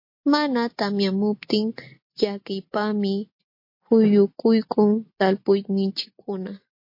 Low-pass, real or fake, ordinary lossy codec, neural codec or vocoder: 5.4 kHz; real; MP3, 32 kbps; none